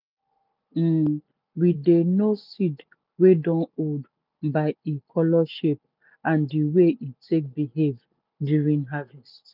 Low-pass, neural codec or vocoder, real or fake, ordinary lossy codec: 5.4 kHz; none; real; none